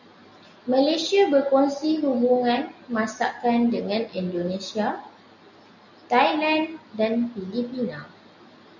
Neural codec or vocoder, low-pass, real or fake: none; 7.2 kHz; real